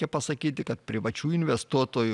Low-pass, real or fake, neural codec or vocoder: 10.8 kHz; real; none